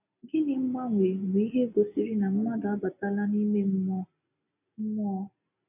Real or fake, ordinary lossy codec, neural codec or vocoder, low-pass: real; none; none; 3.6 kHz